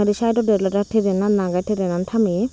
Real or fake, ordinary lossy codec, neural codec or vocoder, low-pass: real; none; none; none